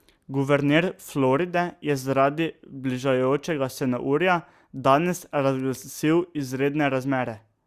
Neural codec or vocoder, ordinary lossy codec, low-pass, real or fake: none; Opus, 64 kbps; 14.4 kHz; real